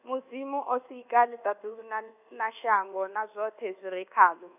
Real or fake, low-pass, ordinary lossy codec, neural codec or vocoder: fake; 3.6 kHz; none; codec, 24 kHz, 1.2 kbps, DualCodec